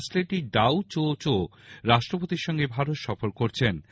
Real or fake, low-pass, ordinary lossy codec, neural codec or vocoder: real; none; none; none